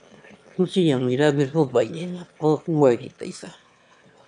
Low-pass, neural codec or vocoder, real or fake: 9.9 kHz; autoencoder, 22.05 kHz, a latent of 192 numbers a frame, VITS, trained on one speaker; fake